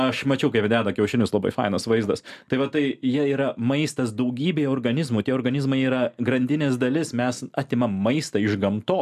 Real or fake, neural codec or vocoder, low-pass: real; none; 14.4 kHz